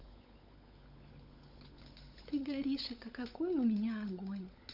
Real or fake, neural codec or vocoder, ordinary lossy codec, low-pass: fake; codec, 16 kHz, 16 kbps, FunCodec, trained on LibriTTS, 50 frames a second; none; 5.4 kHz